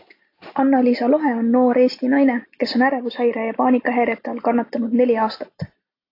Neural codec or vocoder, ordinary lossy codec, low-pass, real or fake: none; AAC, 32 kbps; 5.4 kHz; real